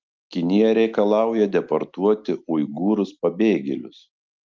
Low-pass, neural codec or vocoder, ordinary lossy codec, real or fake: 7.2 kHz; none; Opus, 24 kbps; real